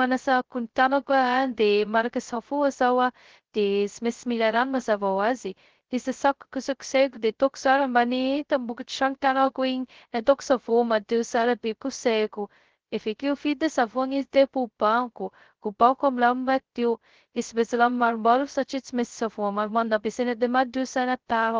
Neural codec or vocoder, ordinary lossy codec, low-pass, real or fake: codec, 16 kHz, 0.2 kbps, FocalCodec; Opus, 16 kbps; 7.2 kHz; fake